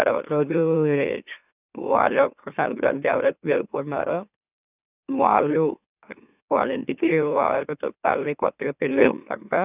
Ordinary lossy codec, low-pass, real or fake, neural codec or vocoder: none; 3.6 kHz; fake; autoencoder, 44.1 kHz, a latent of 192 numbers a frame, MeloTTS